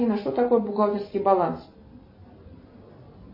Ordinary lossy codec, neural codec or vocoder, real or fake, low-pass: MP3, 24 kbps; none; real; 5.4 kHz